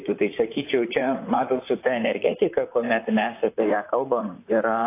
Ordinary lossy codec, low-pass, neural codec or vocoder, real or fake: AAC, 24 kbps; 3.6 kHz; vocoder, 44.1 kHz, 128 mel bands, Pupu-Vocoder; fake